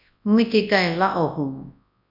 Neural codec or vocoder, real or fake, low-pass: codec, 24 kHz, 0.9 kbps, WavTokenizer, large speech release; fake; 5.4 kHz